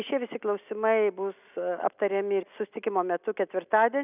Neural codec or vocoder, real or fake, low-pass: none; real; 3.6 kHz